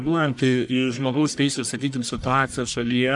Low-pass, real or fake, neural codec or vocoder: 10.8 kHz; fake; codec, 44.1 kHz, 1.7 kbps, Pupu-Codec